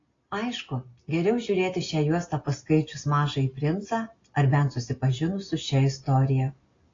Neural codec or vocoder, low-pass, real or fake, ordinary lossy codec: none; 7.2 kHz; real; AAC, 32 kbps